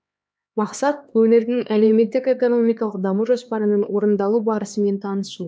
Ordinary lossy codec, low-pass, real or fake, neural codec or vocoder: none; none; fake; codec, 16 kHz, 2 kbps, X-Codec, HuBERT features, trained on LibriSpeech